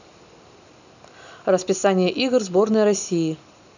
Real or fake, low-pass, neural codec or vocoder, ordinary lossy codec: real; 7.2 kHz; none; none